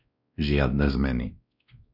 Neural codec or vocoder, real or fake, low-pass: codec, 16 kHz, 1 kbps, X-Codec, WavLM features, trained on Multilingual LibriSpeech; fake; 5.4 kHz